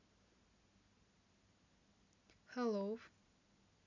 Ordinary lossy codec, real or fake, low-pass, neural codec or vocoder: none; real; 7.2 kHz; none